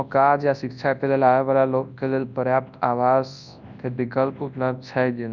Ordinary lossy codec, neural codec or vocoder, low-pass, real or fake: none; codec, 24 kHz, 0.9 kbps, WavTokenizer, large speech release; 7.2 kHz; fake